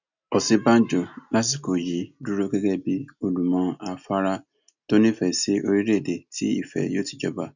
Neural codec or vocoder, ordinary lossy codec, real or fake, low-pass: none; none; real; 7.2 kHz